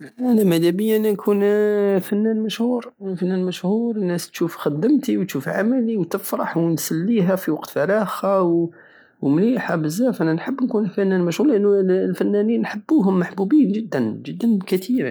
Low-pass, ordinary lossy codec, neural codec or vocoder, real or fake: none; none; none; real